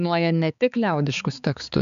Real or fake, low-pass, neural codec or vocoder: fake; 7.2 kHz; codec, 16 kHz, 4 kbps, X-Codec, HuBERT features, trained on balanced general audio